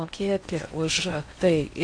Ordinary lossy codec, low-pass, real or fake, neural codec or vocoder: AAC, 48 kbps; 9.9 kHz; fake; codec, 16 kHz in and 24 kHz out, 0.6 kbps, FocalCodec, streaming, 4096 codes